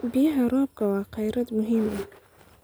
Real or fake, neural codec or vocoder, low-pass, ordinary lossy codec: real; none; none; none